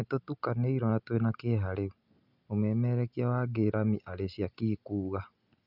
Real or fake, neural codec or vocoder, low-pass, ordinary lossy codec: real; none; 5.4 kHz; none